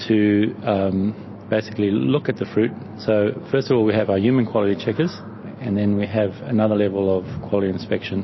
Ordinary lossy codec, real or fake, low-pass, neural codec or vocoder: MP3, 24 kbps; real; 7.2 kHz; none